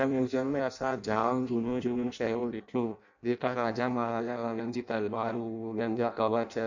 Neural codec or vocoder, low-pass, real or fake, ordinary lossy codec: codec, 16 kHz in and 24 kHz out, 0.6 kbps, FireRedTTS-2 codec; 7.2 kHz; fake; none